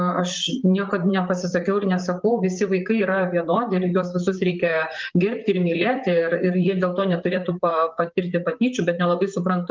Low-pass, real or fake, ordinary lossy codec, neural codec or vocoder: 7.2 kHz; fake; Opus, 32 kbps; vocoder, 44.1 kHz, 128 mel bands, Pupu-Vocoder